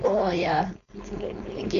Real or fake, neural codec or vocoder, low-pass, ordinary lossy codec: fake; codec, 16 kHz, 4.8 kbps, FACodec; 7.2 kHz; none